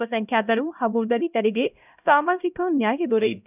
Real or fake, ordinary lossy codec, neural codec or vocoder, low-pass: fake; none; codec, 16 kHz, 0.5 kbps, X-Codec, HuBERT features, trained on LibriSpeech; 3.6 kHz